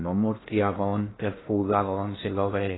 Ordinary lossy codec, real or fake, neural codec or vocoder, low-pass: AAC, 16 kbps; fake; codec, 16 kHz in and 24 kHz out, 0.6 kbps, FocalCodec, streaming, 2048 codes; 7.2 kHz